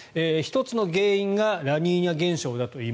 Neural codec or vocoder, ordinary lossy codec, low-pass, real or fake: none; none; none; real